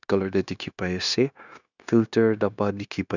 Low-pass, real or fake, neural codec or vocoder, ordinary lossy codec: 7.2 kHz; fake; codec, 16 kHz, 0.9 kbps, LongCat-Audio-Codec; none